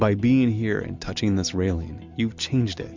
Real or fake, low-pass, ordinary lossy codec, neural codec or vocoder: real; 7.2 kHz; MP3, 64 kbps; none